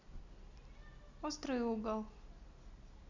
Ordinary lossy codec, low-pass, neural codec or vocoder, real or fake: Opus, 64 kbps; 7.2 kHz; none; real